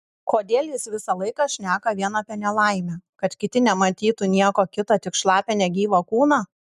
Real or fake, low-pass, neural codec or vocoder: real; 14.4 kHz; none